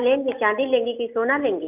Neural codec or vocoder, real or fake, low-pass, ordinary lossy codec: none; real; 3.6 kHz; none